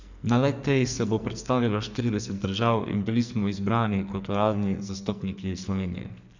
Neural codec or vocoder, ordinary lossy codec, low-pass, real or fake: codec, 44.1 kHz, 2.6 kbps, SNAC; none; 7.2 kHz; fake